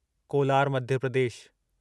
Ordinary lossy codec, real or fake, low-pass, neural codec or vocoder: none; real; none; none